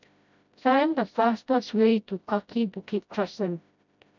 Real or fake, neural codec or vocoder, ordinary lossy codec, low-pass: fake; codec, 16 kHz, 0.5 kbps, FreqCodec, smaller model; none; 7.2 kHz